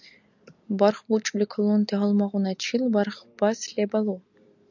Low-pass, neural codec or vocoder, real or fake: 7.2 kHz; none; real